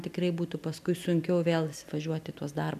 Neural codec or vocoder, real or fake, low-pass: none; real; 14.4 kHz